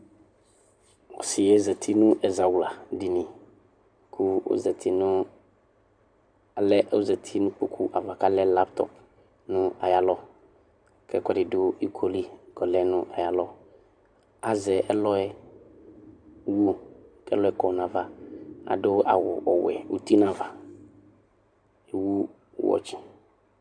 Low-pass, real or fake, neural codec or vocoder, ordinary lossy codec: 9.9 kHz; real; none; MP3, 96 kbps